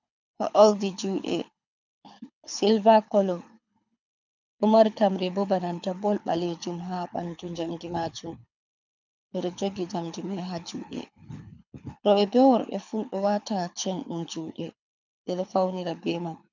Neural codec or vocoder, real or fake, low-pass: codec, 24 kHz, 6 kbps, HILCodec; fake; 7.2 kHz